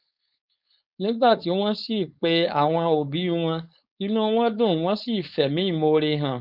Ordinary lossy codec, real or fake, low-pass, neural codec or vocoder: none; fake; 5.4 kHz; codec, 16 kHz, 4.8 kbps, FACodec